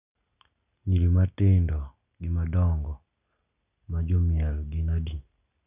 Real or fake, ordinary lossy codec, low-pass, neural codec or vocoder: real; none; 3.6 kHz; none